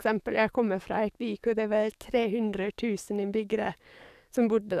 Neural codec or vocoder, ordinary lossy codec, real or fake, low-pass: codec, 44.1 kHz, 7.8 kbps, DAC; none; fake; 14.4 kHz